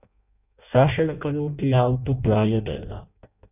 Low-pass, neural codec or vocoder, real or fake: 3.6 kHz; codec, 16 kHz in and 24 kHz out, 0.6 kbps, FireRedTTS-2 codec; fake